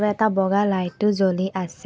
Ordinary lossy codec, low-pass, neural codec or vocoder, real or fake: none; none; none; real